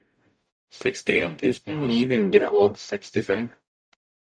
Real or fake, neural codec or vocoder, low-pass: fake; codec, 44.1 kHz, 0.9 kbps, DAC; 9.9 kHz